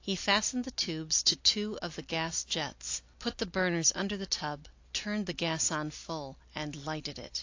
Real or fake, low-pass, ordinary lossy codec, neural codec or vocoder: real; 7.2 kHz; AAC, 48 kbps; none